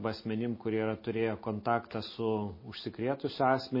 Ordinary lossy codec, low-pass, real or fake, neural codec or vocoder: MP3, 24 kbps; 5.4 kHz; real; none